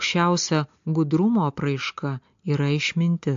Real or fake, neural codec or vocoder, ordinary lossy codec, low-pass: real; none; AAC, 64 kbps; 7.2 kHz